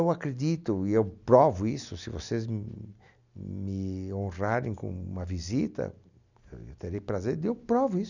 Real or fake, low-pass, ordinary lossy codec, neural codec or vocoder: real; 7.2 kHz; none; none